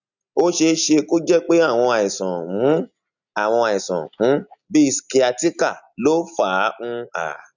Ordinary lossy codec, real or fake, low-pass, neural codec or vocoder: none; real; 7.2 kHz; none